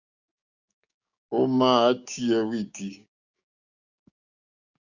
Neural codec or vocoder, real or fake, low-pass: codec, 16 kHz, 6 kbps, DAC; fake; 7.2 kHz